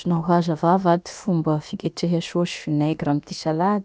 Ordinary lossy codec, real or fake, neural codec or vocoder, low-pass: none; fake; codec, 16 kHz, about 1 kbps, DyCAST, with the encoder's durations; none